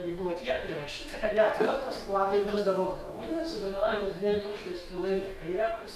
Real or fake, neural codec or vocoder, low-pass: fake; codec, 44.1 kHz, 2.6 kbps, DAC; 14.4 kHz